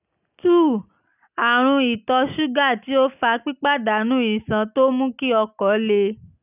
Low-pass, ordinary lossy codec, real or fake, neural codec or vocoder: 3.6 kHz; none; real; none